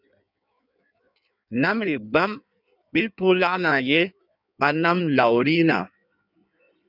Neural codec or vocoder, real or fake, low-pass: codec, 16 kHz in and 24 kHz out, 1.1 kbps, FireRedTTS-2 codec; fake; 5.4 kHz